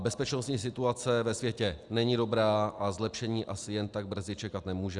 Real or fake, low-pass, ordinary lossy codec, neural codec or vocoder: real; 10.8 kHz; Opus, 64 kbps; none